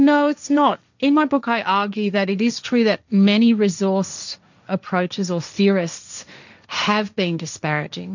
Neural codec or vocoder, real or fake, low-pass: codec, 16 kHz, 1.1 kbps, Voila-Tokenizer; fake; 7.2 kHz